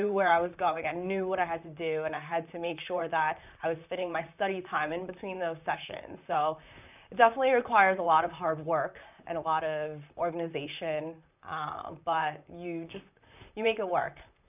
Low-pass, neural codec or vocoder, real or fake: 3.6 kHz; vocoder, 44.1 kHz, 128 mel bands, Pupu-Vocoder; fake